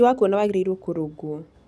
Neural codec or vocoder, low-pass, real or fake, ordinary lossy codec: none; none; real; none